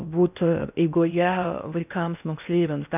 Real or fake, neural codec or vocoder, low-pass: fake; codec, 16 kHz in and 24 kHz out, 0.6 kbps, FocalCodec, streaming, 4096 codes; 3.6 kHz